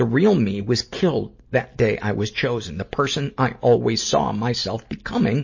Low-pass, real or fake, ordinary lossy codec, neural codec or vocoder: 7.2 kHz; real; MP3, 32 kbps; none